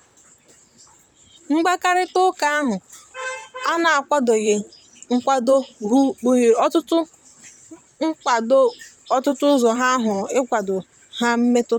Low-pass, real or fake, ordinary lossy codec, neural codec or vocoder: 19.8 kHz; fake; none; vocoder, 44.1 kHz, 128 mel bands, Pupu-Vocoder